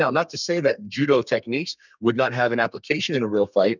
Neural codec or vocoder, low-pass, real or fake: codec, 32 kHz, 1.9 kbps, SNAC; 7.2 kHz; fake